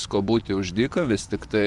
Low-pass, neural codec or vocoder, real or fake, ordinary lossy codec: 10.8 kHz; vocoder, 24 kHz, 100 mel bands, Vocos; fake; Opus, 64 kbps